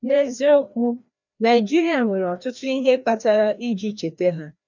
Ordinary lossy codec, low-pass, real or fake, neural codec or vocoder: none; 7.2 kHz; fake; codec, 16 kHz, 1 kbps, FreqCodec, larger model